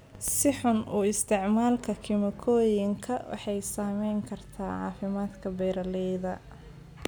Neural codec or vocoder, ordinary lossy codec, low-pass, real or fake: none; none; none; real